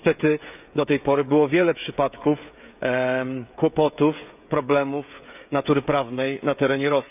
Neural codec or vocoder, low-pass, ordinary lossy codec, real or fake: codec, 16 kHz, 16 kbps, FreqCodec, smaller model; 3.6 kHz; none; fake